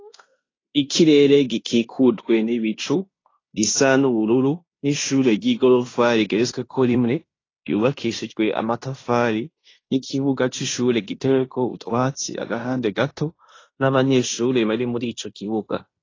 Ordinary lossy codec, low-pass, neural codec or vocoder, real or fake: AAC, 32 kbps; 7.2 kHz; codec, 16 kHz in and 24 kHz out, 0.9 kbps, LongCat-Audio-Codec, fine tuned four codebook decoder; fake